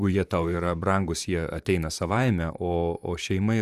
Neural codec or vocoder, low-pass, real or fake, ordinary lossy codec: vocoder, 44.1 kHz, 128 mel bands, Pupu-Vocoder; 14.4 kHz; fake; Opus, 64 kbps